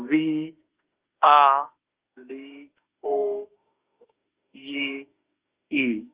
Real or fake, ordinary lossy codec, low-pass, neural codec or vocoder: fake; Opus, 24 kbps; 3.6 kHz; autoencoder, 48 kHz, 32 numbers a frame, DAC-VAE, trained on Japanese speech